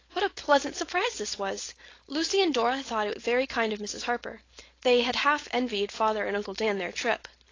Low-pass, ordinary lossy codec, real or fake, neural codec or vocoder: 7.2 kHz; AAC, 32 kbps; fake; codec, 16 kHz, 4.8 kbps, FACodec